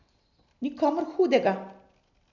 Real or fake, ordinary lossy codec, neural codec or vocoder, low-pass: real; none; none; 7.2 kHz